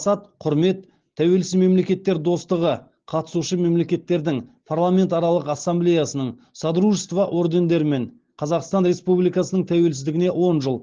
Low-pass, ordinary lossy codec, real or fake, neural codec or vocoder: 7.2 kHz; Opus, 16 kbps; real; none